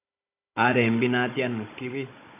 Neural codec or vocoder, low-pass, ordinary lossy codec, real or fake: codec, 16 kHz, 16 kbps, FunCodec, trained on Chinese and English, 50 frames a second; 3.6 kHz; AAC, 24 kbps; fake